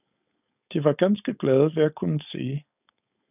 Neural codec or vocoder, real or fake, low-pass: codec, 16 kHz, 4.8 kbps, FACodec; fake; 3.6 kHz